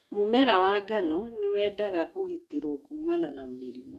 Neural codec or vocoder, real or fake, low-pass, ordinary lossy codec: codec, 44.1 kHz, 2.6 kbps, DAC; fake; 14.4 kHz; none